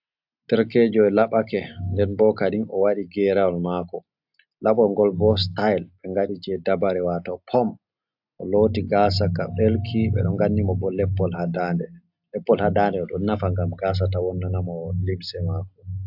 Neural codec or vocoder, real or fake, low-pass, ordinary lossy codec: none; real; 5.4 kHz; AAC, 48 kbps